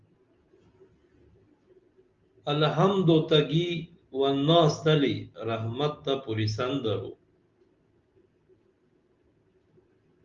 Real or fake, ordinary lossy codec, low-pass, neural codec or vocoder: real; Opus, 32 kbps; 7.2 kHz; none